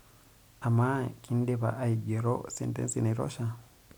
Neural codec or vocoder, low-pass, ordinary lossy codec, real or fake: none; none; none; real